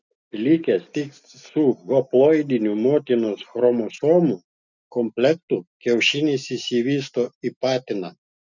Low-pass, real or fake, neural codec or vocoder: 7.2 kHz; real; none